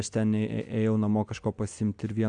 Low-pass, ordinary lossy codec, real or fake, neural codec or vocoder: 9.9 kHz; AAC, 64 kbps; real; none